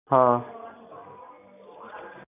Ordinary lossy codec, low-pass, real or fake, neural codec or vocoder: none; 3.6 kHz; real; none